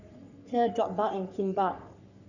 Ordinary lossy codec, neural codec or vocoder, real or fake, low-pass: none; codec, 44.1 kHz, 3.4 kbps, Pupu-Codec; fake; 7.2 kHz